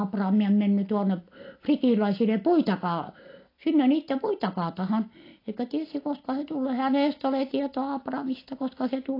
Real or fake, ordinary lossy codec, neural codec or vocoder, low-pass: real; AAC, 32 kbps; none; 5.4 kHz